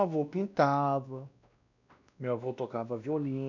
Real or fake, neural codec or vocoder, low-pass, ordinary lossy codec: fake; codec, 16 kHz, 1 kbps, X-Codec, WavLM features, trained on Multilingual LibriSpeech; 7.2 kHz; none